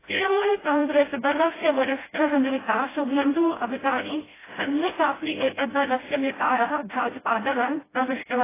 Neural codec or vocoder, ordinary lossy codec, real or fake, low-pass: codec, 16 kHz, 0.5 kbps, FreqCodec, smaller model; AAC, 16 kbps; fake; 3.6 kHz